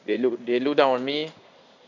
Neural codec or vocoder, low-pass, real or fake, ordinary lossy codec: codec, 16 kHz in and 24 kHz out, 1 kbps, XY-Tokenizer; 7.2 kHz; fake; none